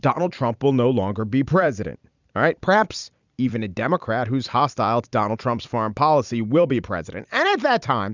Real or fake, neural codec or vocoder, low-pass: fake; vocoder, 44.1 kHz, 128 mel bands every 256 samples, BigVGAN v2; 7.2 kHz